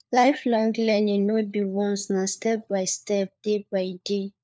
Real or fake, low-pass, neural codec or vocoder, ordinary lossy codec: fake; none; codec, 16 kHz, 4 kbps, FunCodec, trained on LibriTTS, 50 frames a second; none